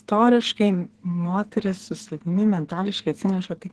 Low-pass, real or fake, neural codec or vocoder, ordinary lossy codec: 10.8 kHz; fake; codec, 44.1 kHz, 2.6 kbps, SNAC; Opus, 16 kbps